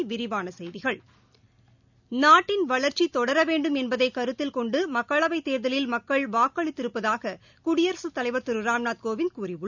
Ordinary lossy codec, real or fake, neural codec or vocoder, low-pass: none; real; none; 7.2 kHz